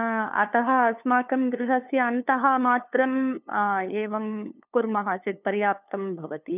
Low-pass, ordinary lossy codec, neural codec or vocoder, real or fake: 3.6 kHz; none; codec, 16 kHz, 2 kbps, FunCodec, trained on LibriTTS, 25 frames a second; fake